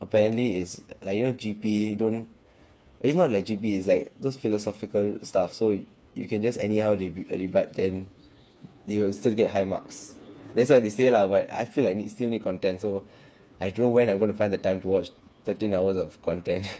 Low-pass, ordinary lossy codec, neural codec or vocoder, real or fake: none; none; codec, 16 kHz, 4 kbps, FreqCodec, smaller model; fake